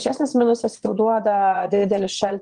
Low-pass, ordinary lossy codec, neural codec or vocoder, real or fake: 9.9 kHz; Opus, 24 kbps; vocoder, 22.05 kHz, 80 mel bands, Vocos; fake